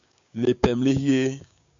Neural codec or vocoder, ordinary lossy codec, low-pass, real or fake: codec, 16 kHz, 6 kbps, DAC; AAC, 64 kbps; 7.2 kHz; fake